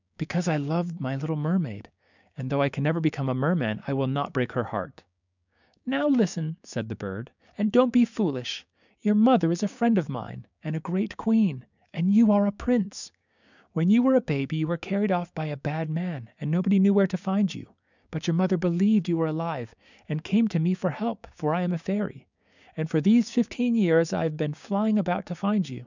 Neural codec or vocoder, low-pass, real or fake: codec, 16 kHz, 6 kbps, DAC; 7.2 kHz; fake